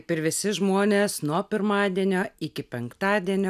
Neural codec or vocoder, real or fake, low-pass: none; real; 14.4 kHz